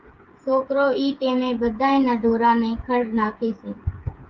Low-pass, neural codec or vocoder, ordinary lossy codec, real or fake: 7.2 kHz; codec, 16 kHz, 8 kbps, FreqCodec, smaller model; Opus, 24 kbps; fake